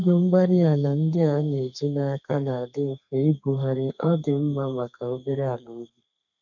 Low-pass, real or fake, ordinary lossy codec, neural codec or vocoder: 7.2 kHz; fake; none; codec, 32 kHz, 1.9 kbps, SNAC